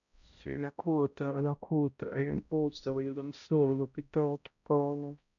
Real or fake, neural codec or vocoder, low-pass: fake; codec, 16 kHz, 0.5 kbps, X-Codec, HuBERT features, trained on balanced general audio; 7.2 kHz